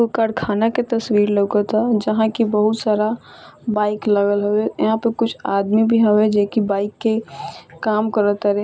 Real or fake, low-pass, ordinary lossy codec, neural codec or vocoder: real; none; none; none